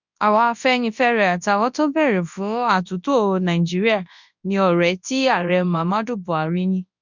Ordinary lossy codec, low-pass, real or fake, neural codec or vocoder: none; 7.2 kHz; fake; codec, 24 kHz, 0.9 kbps, WavTokenizer, large speech release